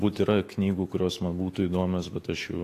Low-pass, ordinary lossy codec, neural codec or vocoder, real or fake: 14.4 kHz; AAC, 48 kbps; autoencoder, 48 kHz, 128 numbers a frame, DAC-VAE, trained on Japanese speech; fake